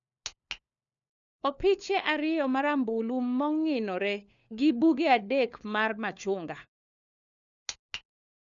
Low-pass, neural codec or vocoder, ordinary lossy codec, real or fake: 7.2 kHz; codec, 16 kHz, 4 kbps, FunCodec, trained on LibriTTS, 50 frames a second; none; fake